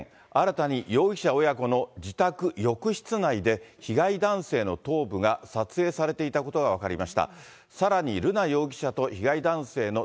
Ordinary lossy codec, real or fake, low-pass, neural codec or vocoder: none; real; none; none